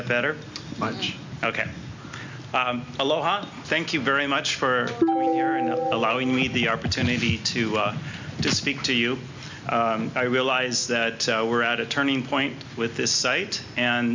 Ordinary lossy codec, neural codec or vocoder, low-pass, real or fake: MP3, 64 kbps; none; 7.2 kHz; real